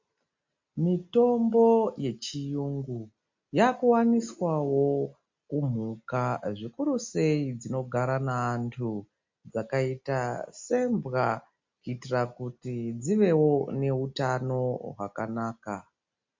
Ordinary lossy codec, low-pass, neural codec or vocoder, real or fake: MP3, 48 kbps; 7.2 kHz; none; real